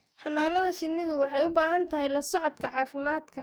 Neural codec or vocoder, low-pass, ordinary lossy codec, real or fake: codec, 44.1 kHz, 2.6 kbps, DAC; none; none; fake